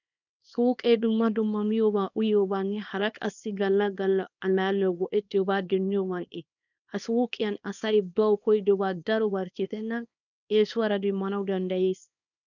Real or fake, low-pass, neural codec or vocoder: fake; 7.2 kHz; codec, 24 kHz, 0.9 kbps, WavTokenizer, small release